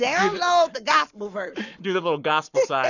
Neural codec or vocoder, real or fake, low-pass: codec, 44.1 kHz, 7.8 kbps, Pupu-Codec; fake; 7.2 kHz